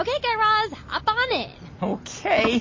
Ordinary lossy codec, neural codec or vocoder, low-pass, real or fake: MP3, 32 kbps; none; 7.2 kHz; real